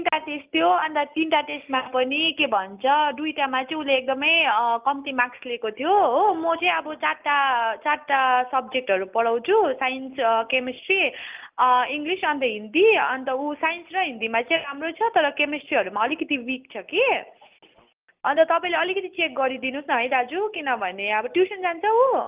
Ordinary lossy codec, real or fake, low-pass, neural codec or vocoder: Opus, 24 kbps; real; 3.6 kHz; none